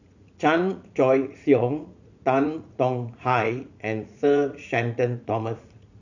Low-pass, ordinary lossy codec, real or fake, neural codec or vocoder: 7.2 kHz; none; fake; vocoder, 44.1 kHz, 80 mel bands, Vocos